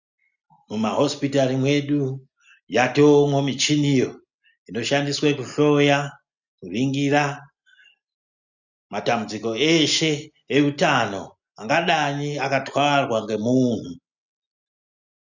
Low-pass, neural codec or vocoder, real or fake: 7.2 kHz; none; real